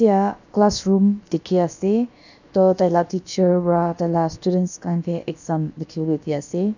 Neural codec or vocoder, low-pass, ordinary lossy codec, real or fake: codec, 16 kHz, about 1 kbps, DyCAST, with the encoder's durations; 7.2 kHz; none; fake